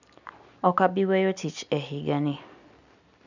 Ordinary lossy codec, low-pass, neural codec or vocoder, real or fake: none; 7.2 kHz; none; real